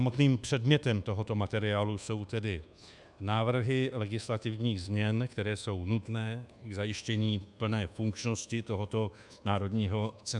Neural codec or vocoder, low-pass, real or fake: codec, 24 kHz, 1.2 kbps, DualCodec; 10.8 kHz; fake